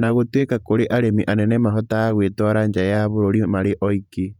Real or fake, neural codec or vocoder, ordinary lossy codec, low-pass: fake; vocoder, 48 kHz, 128 mel bands, Vocos; none; 19.8 kHz